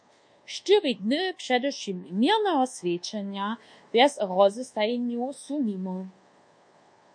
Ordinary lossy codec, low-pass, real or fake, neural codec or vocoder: MP3, 48 kbps; 9.9 kHz; fake; codec, 24 kHz, 1.2 kbps, DualCodec